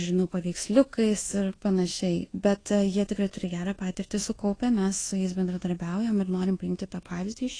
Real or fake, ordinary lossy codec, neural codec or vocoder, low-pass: fake; AAC, 32 kbps; codec, 24 kHz, 1.2 kbps, DualCodec; 9.9 kHz